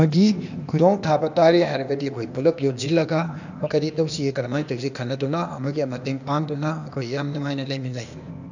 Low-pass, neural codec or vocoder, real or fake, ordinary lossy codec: 7.2 kHz; codec, 16 kHz, 0.8 kbps, ZipCodec; fake; none